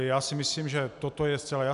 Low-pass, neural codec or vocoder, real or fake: 10.8 kHz; none; real